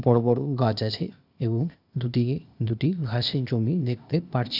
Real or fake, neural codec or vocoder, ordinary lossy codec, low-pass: fake; codec, 16 kHz, 0.8 kbps, ZipCodec; none; 5.4 kHz